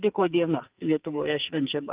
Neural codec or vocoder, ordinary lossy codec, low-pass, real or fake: codec, 44.1 kHz, 2.6 kbps, SNAC; Opus, 32 kbps; 3.6 kHz; fake